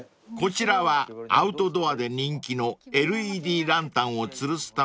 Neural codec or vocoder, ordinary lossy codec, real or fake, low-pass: none; none; real; none